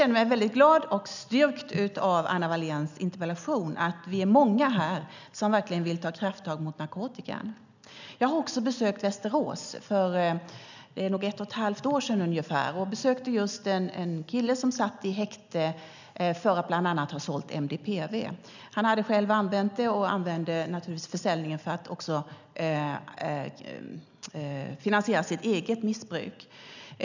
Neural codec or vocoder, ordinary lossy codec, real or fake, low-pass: none; none; real; 7.2 kHz